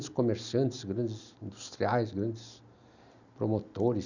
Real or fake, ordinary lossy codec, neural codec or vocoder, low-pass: real; none; none; 7.2 kHz